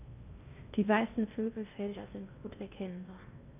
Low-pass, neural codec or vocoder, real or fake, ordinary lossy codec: 3.6 kHz; codec, 16 kHz in and 24 kHz out, 0.8 kbps, FocalCodec, streaming, 65536 codes; fake; none